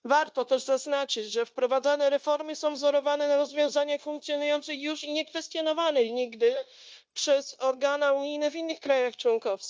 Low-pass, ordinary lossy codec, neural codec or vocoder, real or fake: none; none; codec, 16 kHz, 0.9 kbps, LongCat-Audio-Codec; fake